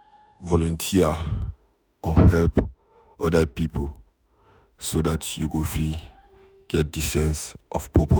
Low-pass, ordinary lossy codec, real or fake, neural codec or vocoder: none; none; fake; autoencoder, 48 kHz, 32 numbers a frame, DAC-VAE, trained on Japanese speech